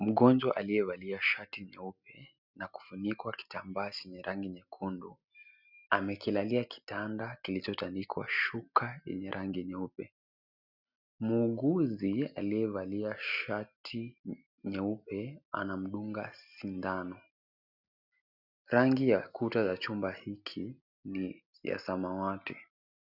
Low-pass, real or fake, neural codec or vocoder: 5.4 kHz; real; none